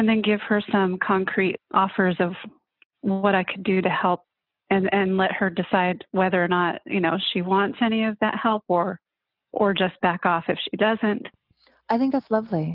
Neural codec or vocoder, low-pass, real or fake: none; 5.4 kHz; real